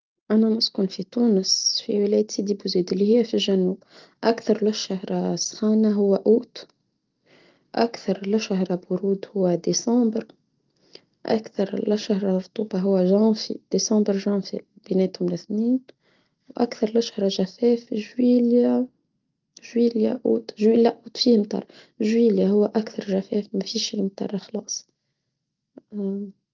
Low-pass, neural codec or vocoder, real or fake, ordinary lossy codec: 7.2 kHz; none; real; Opus, 32 kbps